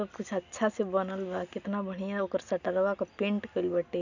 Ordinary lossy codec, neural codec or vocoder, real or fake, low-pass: none; none; real; 7.2 kHz